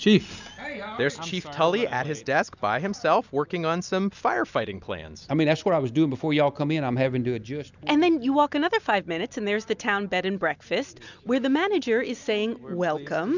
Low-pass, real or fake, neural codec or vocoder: 7.2 kHz; real; none